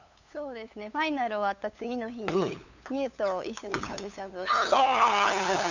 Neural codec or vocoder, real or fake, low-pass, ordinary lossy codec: codec, 16 kHz, 8 kbps, FunCodec, trained on LibriTTS, 25 frames a second; fake; 7.2 kHz; none